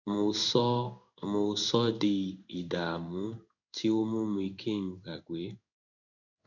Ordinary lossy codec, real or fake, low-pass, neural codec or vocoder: none; fake; 7.2 kHz; codec, 16 kHz in and 24 kHz out, 1 kbps, XY-Tokenizer